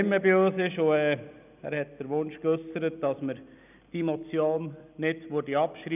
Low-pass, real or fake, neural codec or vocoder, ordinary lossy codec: 3.6 kHz; real; none; none